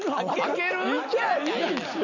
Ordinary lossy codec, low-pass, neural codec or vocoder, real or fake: none; 7.2 kHz; none; real